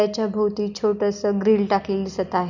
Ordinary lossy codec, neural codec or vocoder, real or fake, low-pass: none; none; real; 7.2 kHz